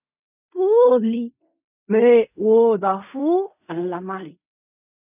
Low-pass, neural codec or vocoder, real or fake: 3.6 kHz; codec, 16 kHz in and 24 kHz out, 0.4 kbps, LongCat-Audio-Codec, fine tuned four codebook decoder; fake